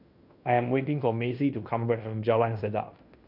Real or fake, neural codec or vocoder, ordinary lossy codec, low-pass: fake; codec, 16 kHz in and 24 kHz out, 0.9 kbps, LongCat-Audio-Codec, fine tuned four codebook decoder; none; 5.4 kHz